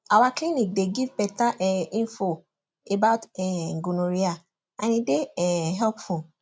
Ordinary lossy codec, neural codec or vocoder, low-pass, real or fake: none; none; none; real